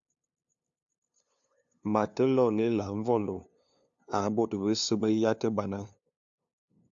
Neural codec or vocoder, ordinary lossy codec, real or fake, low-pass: codec, 16 kHz, 2 kbps, FunCodec, trained on LibriTTS, 25 frames a second; AAC, 64 kbps; fake; 7.2 kHz